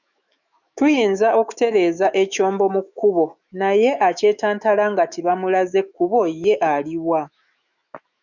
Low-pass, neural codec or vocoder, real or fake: 7.2 kHz; autoencoder, 48 kHz, 128 numbers a frame, DAC-VAE, trained on Japanese speech; fake